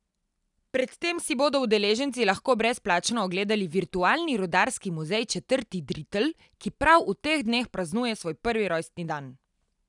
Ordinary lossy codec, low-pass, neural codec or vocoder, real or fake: none; 10.8 kHz; none; real